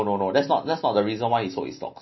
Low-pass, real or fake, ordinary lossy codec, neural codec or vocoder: 7.2 kHz; real; MP3, 24 kbps; none